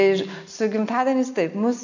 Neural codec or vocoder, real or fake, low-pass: none; real; 7.2 kHz